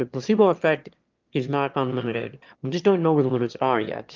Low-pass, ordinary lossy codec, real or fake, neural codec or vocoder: 7.2 kHz; Opus, 24 kbps; fake; autoencoder, 22.05 kHz, a latent of 192 numbers a frame, VITS, trained on one speaker